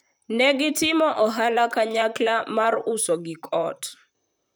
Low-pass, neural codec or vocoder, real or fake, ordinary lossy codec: none; vocoder, 44.1 kHz, 128 mel bands, Pupu-Vocoder; fake; none